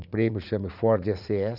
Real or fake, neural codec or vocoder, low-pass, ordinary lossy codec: fake; vocoder, 44.1 kHz, 80 mel bands, Vocos; 5.4 kHz; none